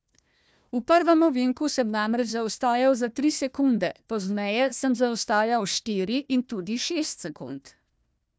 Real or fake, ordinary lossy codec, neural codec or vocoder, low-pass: fake; none; codec, 16 kHz, 1 kbps, FunCodec, trained on Chinese and English, 50 frames a second; none